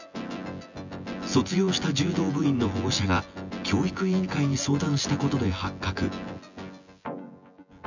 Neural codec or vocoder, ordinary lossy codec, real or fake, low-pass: vocoder, 24 kHz, 100 mel bands, Vocos; none; fake; 7.2 kHz